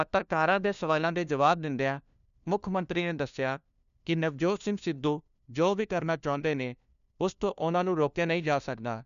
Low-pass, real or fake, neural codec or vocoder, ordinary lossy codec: 7.2 kHz; fake; codec, 16 kHz, 1 kbps, FunCodec, trained on LibriTTS, 50 frames a second; none